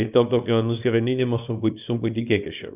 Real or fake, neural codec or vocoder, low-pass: fake; codec, 24 kHz, 0.9 kbps, WavTokenizer, small release; 3.6 kHz